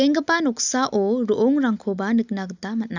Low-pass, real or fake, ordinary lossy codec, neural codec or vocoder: 7.2 kHz; real; none; none